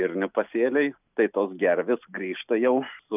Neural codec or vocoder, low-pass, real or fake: none; 3.6 kHz; real